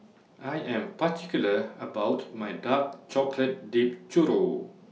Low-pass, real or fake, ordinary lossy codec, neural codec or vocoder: none; real; none; none